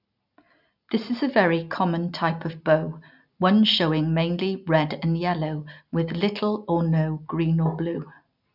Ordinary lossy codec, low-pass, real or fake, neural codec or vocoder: none; 5.4 kHz; real; none